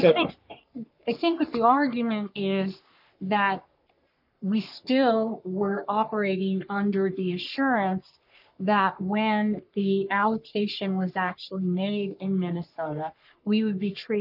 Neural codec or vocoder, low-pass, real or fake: codec, 44.1 kHz, 3.4 kbps, Pupu-Codec; 5.4 kHz; fake